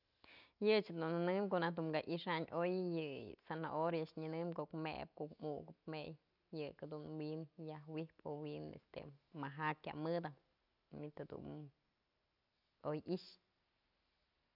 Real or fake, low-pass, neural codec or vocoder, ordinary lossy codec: real; 5.4 kHz; none; none